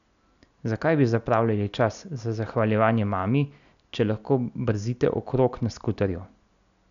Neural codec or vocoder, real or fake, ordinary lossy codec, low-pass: none; real; none; 7.2 kHz